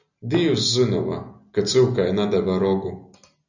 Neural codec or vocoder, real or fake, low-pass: none; real; 7.2 kHz